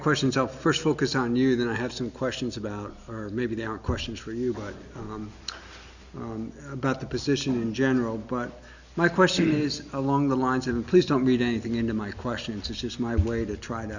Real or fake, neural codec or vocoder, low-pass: real; none; 7.2 kHz